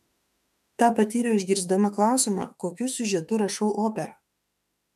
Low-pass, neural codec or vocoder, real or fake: 14.4 kHz; autoencoder, 48 kHz, 32 numbers a frame, DAC-VAE, trained on Japanese speech; fake